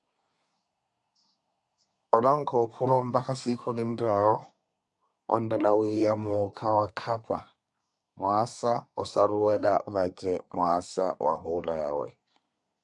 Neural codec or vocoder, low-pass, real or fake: codec, 24 kHz, 1 kbps, SNAC; 10.8 kHz; fake